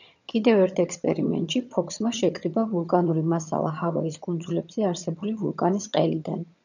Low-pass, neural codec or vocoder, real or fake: 7.2 kHz; vocoder, 22.05 kHz, 80 mel bands, HiFi-GAN; fake